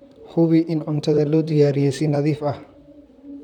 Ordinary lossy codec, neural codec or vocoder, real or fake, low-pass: none; vocoder, 44.1 kHz, 128 mel bands, Pupu-Vocoder; fake; 19.8 kHz